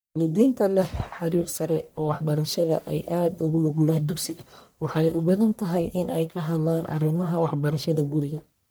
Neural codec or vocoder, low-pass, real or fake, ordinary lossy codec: codec, 44.1 kHz, 1.7 kbps, Pupu-Codec; none; fake; none